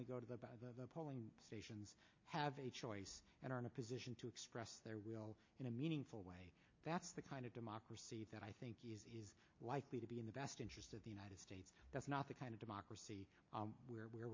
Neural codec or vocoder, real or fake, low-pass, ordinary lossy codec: none; real; 7.2 kHz; MP3, 32 kbps